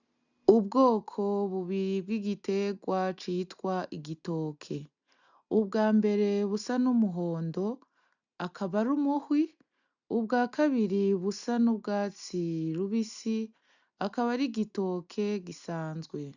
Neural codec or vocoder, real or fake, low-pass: none; real; 7.2 kHz